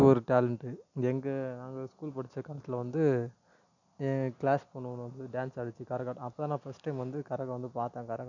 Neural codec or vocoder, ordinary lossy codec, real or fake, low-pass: none; none; real; 7.2 kHz